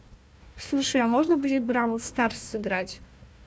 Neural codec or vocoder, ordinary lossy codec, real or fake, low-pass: codec, 16 kHz, 1 kbps, FunCodec, trained on Chinese and English, 50 frames a second; none; fake; none